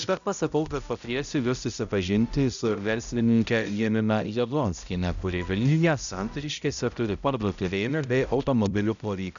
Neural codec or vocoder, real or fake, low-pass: codec, 16 kHz, 0.5 kbps, X-Codec, HuBERT features, trained on balanced general audio; fake; 7.2 kHz